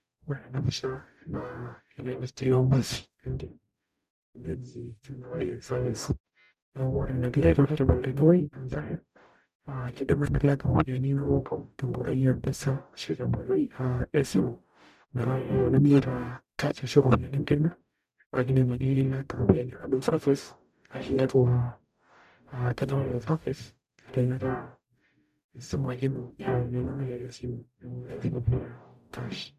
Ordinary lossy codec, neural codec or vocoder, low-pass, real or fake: none; codec, 44.1 kHz, 0.9 kbps, DAC; 14.4 kHz; fake